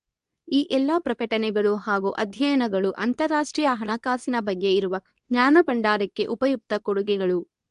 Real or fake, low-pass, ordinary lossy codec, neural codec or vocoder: fake; 10.8 kHz; AAC, 96 kbps; codec, 24 kHz, 0.9 kbps, WavTokenizer, medium speech release version 2